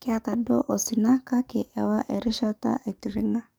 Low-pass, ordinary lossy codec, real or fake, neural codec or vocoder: none; none; fake; codec, 44.1 kHz, 7.8 kbps, DAC